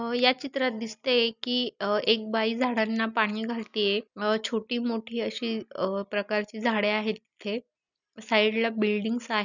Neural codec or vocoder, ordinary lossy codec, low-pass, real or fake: none; none; 7.2 kHz; real